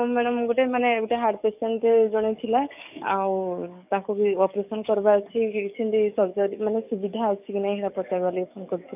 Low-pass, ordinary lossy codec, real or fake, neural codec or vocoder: 3.6 kHz; none; fake; codec, 44.1 kHz, 7.8 kbps, DAC